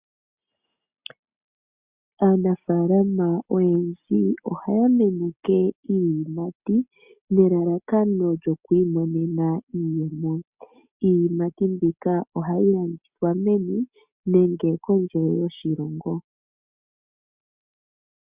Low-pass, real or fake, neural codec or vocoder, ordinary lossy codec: 3.6 kHz; real; none; Opus, 64 kbps